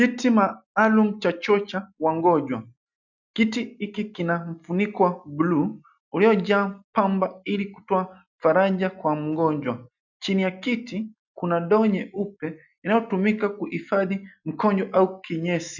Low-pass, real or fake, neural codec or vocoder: 7.2 kHz; real; none